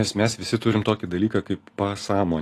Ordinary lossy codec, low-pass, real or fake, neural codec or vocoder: AAC, 48 kbps; 14.4 kHz; real; none